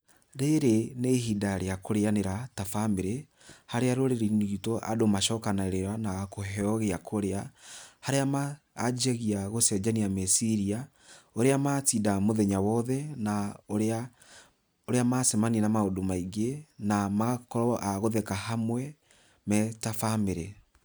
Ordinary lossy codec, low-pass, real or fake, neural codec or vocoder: none; none; real; none